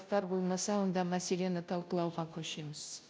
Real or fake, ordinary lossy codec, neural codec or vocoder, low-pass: fake; none; codec, 16 kHz, 0.5 kbps, FunCodec, trained on Chinese and English, 25 frames a second; none